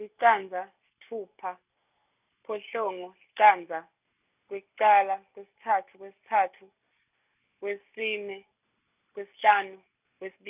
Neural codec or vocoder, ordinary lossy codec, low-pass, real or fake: none; none; 3.6 kHz; real